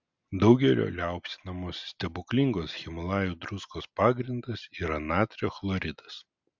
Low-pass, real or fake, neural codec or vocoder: 7.2 kHz; real; none